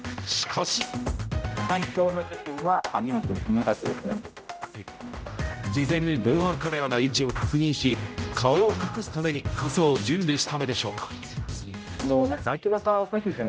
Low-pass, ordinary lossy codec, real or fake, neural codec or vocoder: none; none; fake; codec, 16 kHz, 0.5 kbps, X-Codec, HuBERT features, trained on general audio